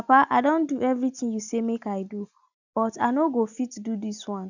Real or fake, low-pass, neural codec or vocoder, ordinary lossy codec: real; 7.2 kHz; none; none